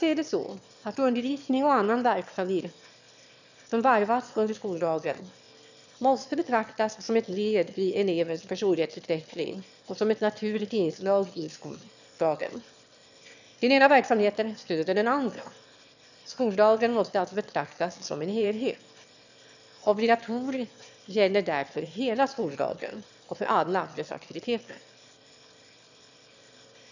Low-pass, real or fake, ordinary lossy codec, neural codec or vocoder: 7.2 kHz; fake; none; autoencoder, 22.05 kHz, a latent of 192 numbers a frame, VITS, trained on one speaker